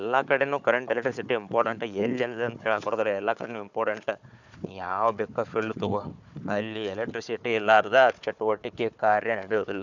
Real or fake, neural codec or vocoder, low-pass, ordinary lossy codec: fake; codec, 16 kHz, 4 kbps, FunCodec, trained on Chinese and English, 50 frames a second; 7.2 kHz; none